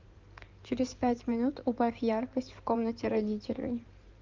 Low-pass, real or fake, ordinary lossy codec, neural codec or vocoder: 7.2 kHz; fake; Opus, 24 kbps; codec, 16 kHz in and 24 kHz out, 2.2 kbps, FireRedTTS-2 codec